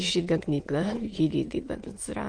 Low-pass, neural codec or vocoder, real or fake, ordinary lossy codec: 9.9 kHz; autoencoder, 22.05 kHz, a latent of 192 numbers a frame, VITS, trained on many speakers; fake; Opus, 24 kbps